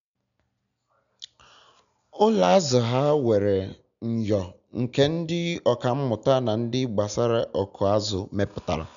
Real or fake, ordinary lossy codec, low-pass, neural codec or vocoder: real; none; 7.2 kHz; none